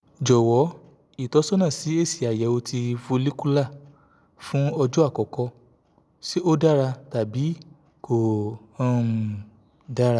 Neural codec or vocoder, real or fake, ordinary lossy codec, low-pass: none; real; none; none